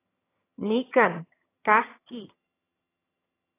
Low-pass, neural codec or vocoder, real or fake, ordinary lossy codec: 3.6 kHz; vocoder, 22.05 kHz, 80 mel bands, HiFi-GAN; fake; AAC, 16 kbps